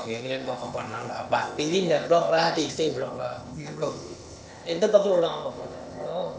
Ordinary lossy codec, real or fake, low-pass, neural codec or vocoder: none; fake; none; codec, 16 kHz, 0.8 kbps, ZipCodec